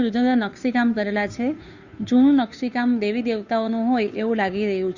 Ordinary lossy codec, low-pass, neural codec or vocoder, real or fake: Opus, 64 kbps; 7.2 kHz; codec, 16 kHz, 2 kbps, FunCodec, trained on Chinese and English, 25 frames a second; fake